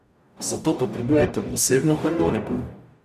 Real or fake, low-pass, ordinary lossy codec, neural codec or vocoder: fake; 14.4 kHz; none; codec, 44.1 kHz, 0.9 kbps, DAC